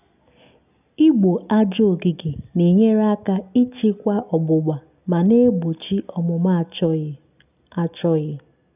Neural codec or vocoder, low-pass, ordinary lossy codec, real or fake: none; 3.6 kHz; none; real